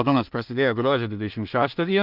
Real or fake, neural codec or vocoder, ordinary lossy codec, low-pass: fake; codec, 16 kHz in and 24 kHz out, 0.4 kbps, LongCat-Audio-Codec, two codebook decoder; Opus, 32 kbps; 5.4 kHz